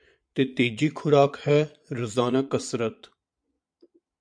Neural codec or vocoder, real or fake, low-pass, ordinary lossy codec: vocoder, 22.05 kHz, 80 mel bands, Vocos; fake; 9.9 kHz; MP3, 64 kbps